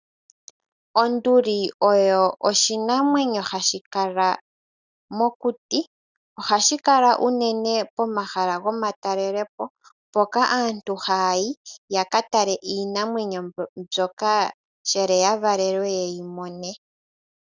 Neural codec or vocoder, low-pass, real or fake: none; 7.2 kHz; real